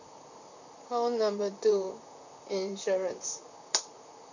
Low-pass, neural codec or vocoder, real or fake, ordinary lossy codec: 7.2 kHz; vocoder, 44.1 kHz, 128 mel bands, Pupu-Vocoder; fake; none